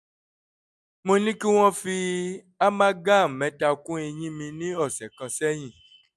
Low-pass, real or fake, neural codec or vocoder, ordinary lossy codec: none; real; none; none